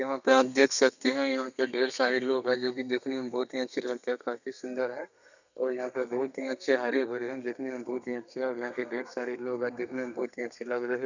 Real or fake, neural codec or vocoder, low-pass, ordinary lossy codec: fake; codec, 32 kHz, 1.9 kbps, SNAC; 7.2 kHz; none